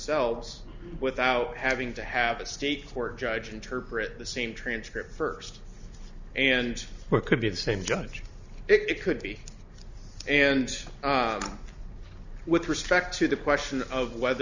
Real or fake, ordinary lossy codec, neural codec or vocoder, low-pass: real; Opus, 64 kbps; none; 7.2 kHz